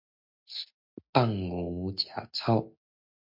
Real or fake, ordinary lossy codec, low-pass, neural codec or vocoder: real; MP3, 48 kbps; 5.4 kHz; none